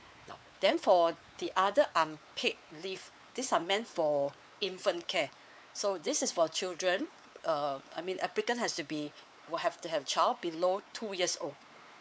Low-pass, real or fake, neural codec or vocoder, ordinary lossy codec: none; fake; codec, 16 kHz, 4 kbps, X-Codec, WavLM features, trained on Multilingual LibriSpeech; none